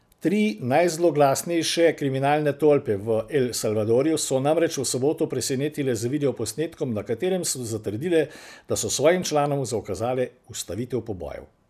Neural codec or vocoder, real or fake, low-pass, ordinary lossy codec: none; real; 14.4 kHz; none